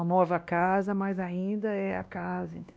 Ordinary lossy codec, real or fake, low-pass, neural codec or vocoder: none; fake; none; codec, 16 kHz, 1 kbps, X-Codec, WavLM features, trained on Multilingual LibriSpeech